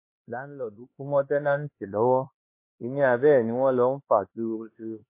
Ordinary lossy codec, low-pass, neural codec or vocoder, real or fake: MP3, 24 kbps; 3.6 kHz; codec, 16 kHz, 2 kbps, X-Codec, HuBERT features, trained on LibriSpeech; fake